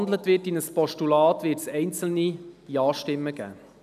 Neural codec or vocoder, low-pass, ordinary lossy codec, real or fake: none; 14.4 kHz; none; real